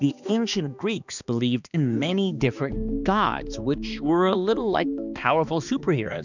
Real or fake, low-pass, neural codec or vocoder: fake; 7.2 kHz; codec, 16 kHz, 2 kbps, X-Codec, HuBERT features, trained on balanced general audio